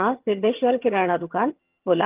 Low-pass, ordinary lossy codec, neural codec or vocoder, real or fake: 3.6 kHz; Opus, 32 kbps; vocoder, 22.05 kHz, 80 mel bands, HiFi-GAN; fake